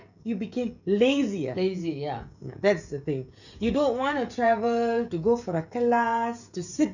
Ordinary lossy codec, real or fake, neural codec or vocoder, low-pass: none; fake; codec, 16 kHz, 16 kbps, FreqCodec, smaller model; 7.2 kHz